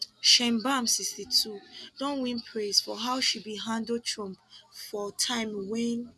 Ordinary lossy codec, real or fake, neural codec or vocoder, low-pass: none; real; none; none